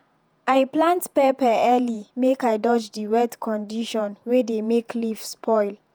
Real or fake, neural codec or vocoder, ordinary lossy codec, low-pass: fake; vocoder, 48 kHz, 128 mel bands, Vocos; none; none